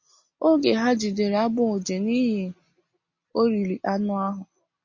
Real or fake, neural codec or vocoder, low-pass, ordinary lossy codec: real; none; 7.2 kHz; MP3, 32 kbps